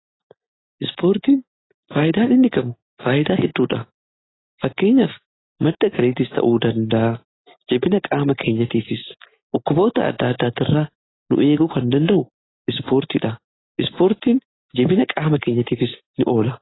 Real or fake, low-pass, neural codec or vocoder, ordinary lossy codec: real; 7.2 kHz; none; AAC, 16 kbps